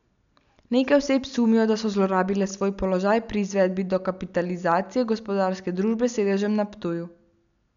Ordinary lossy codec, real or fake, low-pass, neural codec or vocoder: none; real; 7.2 kHz; none